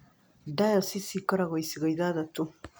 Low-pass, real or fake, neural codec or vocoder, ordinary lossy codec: none; real; none; none